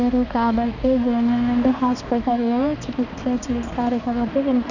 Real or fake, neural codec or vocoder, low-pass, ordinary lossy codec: fake; codec, 16 kHz, 2 kbps, X-Codec, HuBERT features, trained on balanced general audio; 7.2 kHz; none